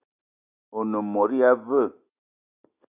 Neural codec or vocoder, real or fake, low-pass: none; real; 3.6 kHz